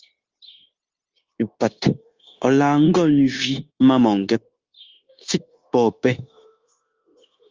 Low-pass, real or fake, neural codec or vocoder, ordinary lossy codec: 7.2 kHz; fake; codec, 16 kHz, 0.9 kbps, LongCat-Audio-Codec; Opus, 32 kbps